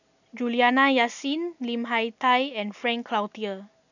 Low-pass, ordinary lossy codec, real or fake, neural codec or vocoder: 7.2 kHz; none; real; none